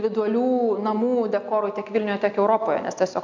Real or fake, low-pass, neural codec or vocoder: real; 7.2 kHz; none